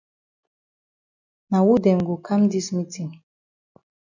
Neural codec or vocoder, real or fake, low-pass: none; real; 7.2 kHz